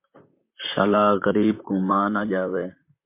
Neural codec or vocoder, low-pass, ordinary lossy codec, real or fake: vocoder, 44.1 kHz, 128 mel bands, Pupu-Vocoder; 3.6 kHz; MP3, 24 kbps; fake